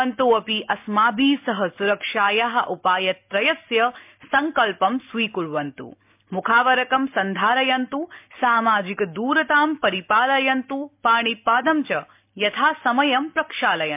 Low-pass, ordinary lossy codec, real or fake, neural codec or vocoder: 3.6 kHz; MP3, 32 kbps; real; none